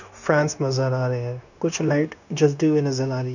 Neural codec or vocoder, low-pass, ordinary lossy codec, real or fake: codec, 16 kHz, 0.9 kbps, LongCat-Audio-Codec; 7.2 kHz; none; fake